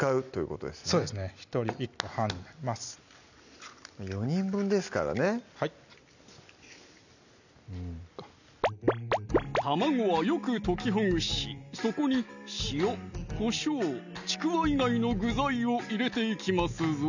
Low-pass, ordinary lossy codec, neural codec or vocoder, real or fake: 7.2 kHz; none; none; real